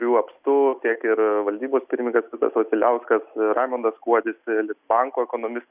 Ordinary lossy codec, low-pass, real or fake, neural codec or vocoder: Opus, 64 kbps; 3.6 kHz; real; none